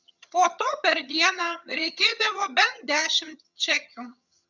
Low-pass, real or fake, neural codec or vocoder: 7.2 kHz; fake; vocoder, 22.05 kHz, 80 mel bands, HiFi-GAN